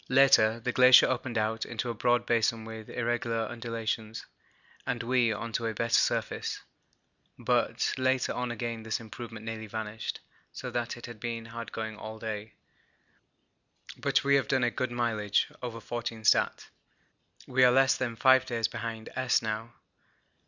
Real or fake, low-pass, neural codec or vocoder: real; 7.2 kHz; none